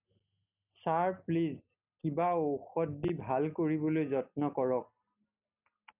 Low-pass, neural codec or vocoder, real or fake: 3.6 kHz; none; real